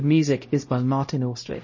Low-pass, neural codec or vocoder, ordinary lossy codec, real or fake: 7.2 kHz; codec, 16 kHz, 0.5 kbps, X-Codec, HuBERT features, trained on LibriSpeech; MP3, 32 kbps; fake